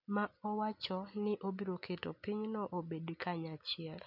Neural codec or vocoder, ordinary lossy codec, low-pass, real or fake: none; none; 5.4 kHz; real